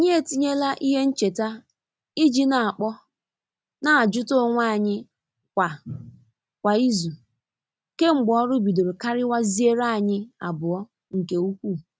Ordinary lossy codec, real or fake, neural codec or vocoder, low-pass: none; real; none; none